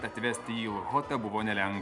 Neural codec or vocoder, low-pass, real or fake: none; 10.8 kHz; real